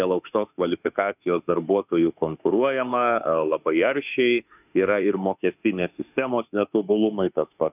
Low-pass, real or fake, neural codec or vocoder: 3.6 kHz; fake; autoencoder, 48 kHz, 32 numbers a frame, DAC-VAE, trained on Japanese speech